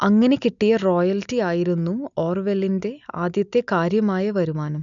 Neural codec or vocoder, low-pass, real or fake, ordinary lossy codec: none; 7.2 kHz; real; none